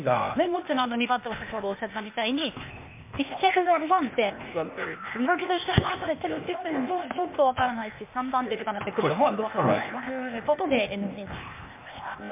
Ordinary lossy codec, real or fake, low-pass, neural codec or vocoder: MP3, 32 kbps; fake; 3.6 kHz; codec, 16 kHz, 0.8 kbps, ZipCodec